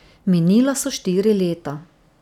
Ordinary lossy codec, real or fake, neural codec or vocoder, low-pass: none; real; none; 19.8 kHz